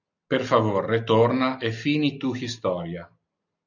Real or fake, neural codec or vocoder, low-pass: real; none; 7.2 kHz